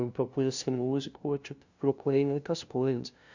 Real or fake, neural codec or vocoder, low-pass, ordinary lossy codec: fake; codec, 16 kHz, 0.5 kbps, FunCodec, trained on LibriTTS, 25 frames a second; 7.2 kHz; Opus, 64 kbps